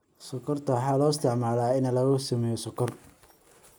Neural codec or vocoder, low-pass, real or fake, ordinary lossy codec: none; none; real; none